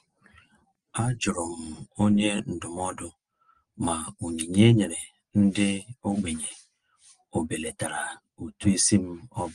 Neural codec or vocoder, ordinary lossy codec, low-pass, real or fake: none; Opus, 24 kbps; 9.9 kHz; real